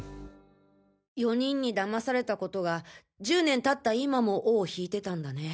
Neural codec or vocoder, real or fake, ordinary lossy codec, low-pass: none; real; none; none